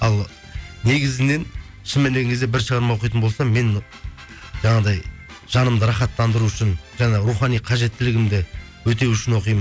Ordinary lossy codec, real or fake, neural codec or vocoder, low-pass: none; real; none; none